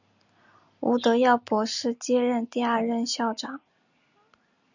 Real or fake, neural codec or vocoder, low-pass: real; none; 7.2 kHz